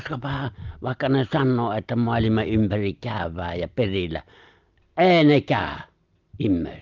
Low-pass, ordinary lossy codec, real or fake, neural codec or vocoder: 7.2 kHz; Opus, 24 kbps; real; none